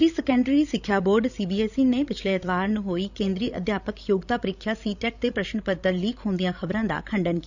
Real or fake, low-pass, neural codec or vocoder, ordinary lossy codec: fake; 7.2 kHz; codec, 16 kHz, 16 kbps, FreqCodec, larger model; none